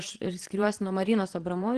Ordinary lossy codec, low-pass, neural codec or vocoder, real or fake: Opus, 16 kbps; 9.9 kHz; vocoder, 22.05 kHz, 80 mel bands, Vocos; fake